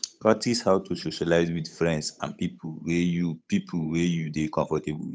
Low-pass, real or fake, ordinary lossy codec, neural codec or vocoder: none; fake; none; codec, 16 kHz, 8 kbps, FunCodec, trained on Chinese and English, 25 frames a second